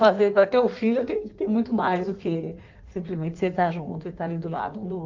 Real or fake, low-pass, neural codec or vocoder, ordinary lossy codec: fake; 7.2 kHz; codec, 16 kHz in and 24 kHz out, 1.1 kbps, FireRedTTS-2 codec; Opus, 24 kbps